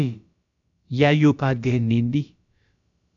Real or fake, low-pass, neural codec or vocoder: fake; 7.2 kHz; codec, 16 kHz, about 1 kbps, DyCAST, with the encoder's durations